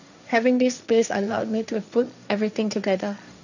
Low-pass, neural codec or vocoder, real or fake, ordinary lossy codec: 7.2 kHz; codec, 16 kHz, 1.1 kbps, Voila-Tokenizer; fake; none